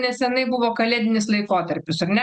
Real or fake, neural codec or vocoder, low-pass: real; none; 9.9 kHz